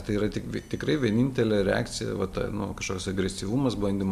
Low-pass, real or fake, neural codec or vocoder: 14.4 kHz; real; none